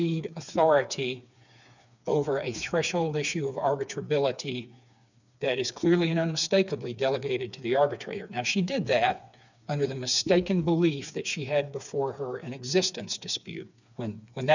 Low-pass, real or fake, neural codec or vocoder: 7.2 kHz; fake; codec, 16 kHz, 4 kbps, FreqCodec, smaller model